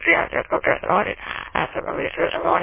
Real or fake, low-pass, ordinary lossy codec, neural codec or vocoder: fake; 3.6 kHz; MP3, 16 kbps; autoencoder, 22.05 kHz, a latent of 192 numbers a frame, VITS, trained on many speakers